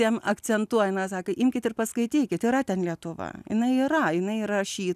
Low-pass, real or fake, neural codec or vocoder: 14.4 kHz; real; none